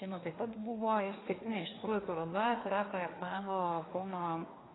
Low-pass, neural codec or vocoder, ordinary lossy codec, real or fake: 7.2 kHz; codec, 24 kHz, 1 kbps, SNAC; AAC, 16 kbps; fake